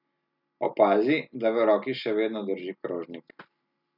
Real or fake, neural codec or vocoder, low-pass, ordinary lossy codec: real; none; 5.4 kHz; none